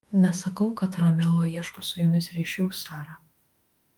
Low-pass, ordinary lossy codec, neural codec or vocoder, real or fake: 19.8 kHz; Opus, 32 kbps; autoencoder, 48 kHz, 32 numbers a frame, DAC-VAE, trained on Japanese speech; fake